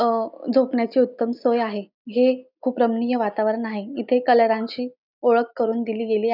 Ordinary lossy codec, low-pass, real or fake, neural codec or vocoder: none; 5.4 kHz; real; none